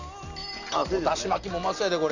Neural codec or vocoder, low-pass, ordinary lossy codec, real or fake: none; 7.2 kHz; none; real